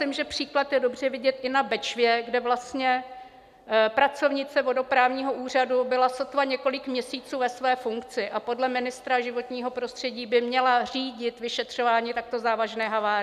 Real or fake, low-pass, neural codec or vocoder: real; 14.4 kHz; none